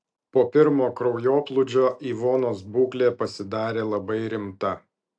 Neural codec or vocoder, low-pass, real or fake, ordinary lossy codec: none; 9.9 kHz; real; AAC, 64 kbps